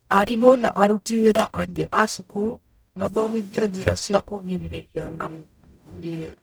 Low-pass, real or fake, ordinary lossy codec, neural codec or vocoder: none; fake; none; codec, 44.1 kHz, 0.9 kbps, DAC